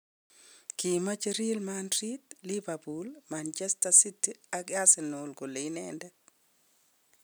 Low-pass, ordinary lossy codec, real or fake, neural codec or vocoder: none; none; real; none